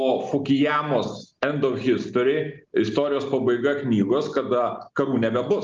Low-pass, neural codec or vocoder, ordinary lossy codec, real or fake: 7.2 kHz; none; Opus, 32 kbps; real